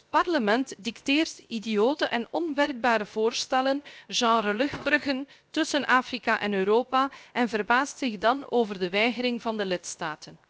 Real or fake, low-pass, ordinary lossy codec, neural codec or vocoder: fake; none; none; codec, 16 kHz, 0.7 kbps, FocalCodec